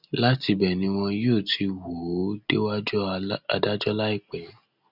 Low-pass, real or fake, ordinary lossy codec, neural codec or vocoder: 5.4 kHz; real; none; none